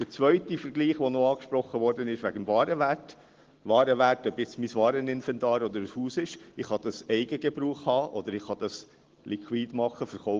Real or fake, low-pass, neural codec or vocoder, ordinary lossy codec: real; 7.2 kHz; none; Opus, 16 kbps